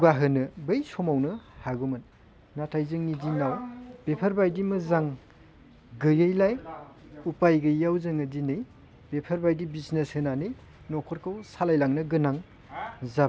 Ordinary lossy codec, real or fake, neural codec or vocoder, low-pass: none; real; none; none